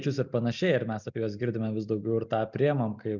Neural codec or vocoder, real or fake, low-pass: none; real; 7.2 kHz